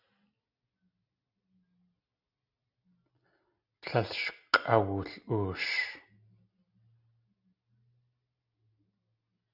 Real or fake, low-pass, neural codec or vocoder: real; 5.4 kHz; none